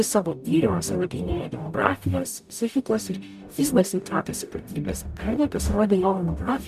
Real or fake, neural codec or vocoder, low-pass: fake; codec, 44.1 kHz, 0.9 kbps, DAC; 14.4 kHz